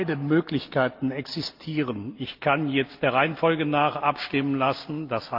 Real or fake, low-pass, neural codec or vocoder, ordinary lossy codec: real; 5.4 kHz; none; Opus, 24 kbps